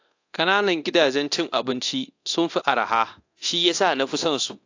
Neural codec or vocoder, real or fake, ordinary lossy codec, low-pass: codec, 24 kHz, 0.9 kbps, DualCodec; fake; AAC, 48 kbps; 7.2 kHz